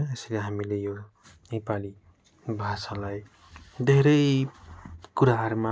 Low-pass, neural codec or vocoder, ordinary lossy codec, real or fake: none; none; none; real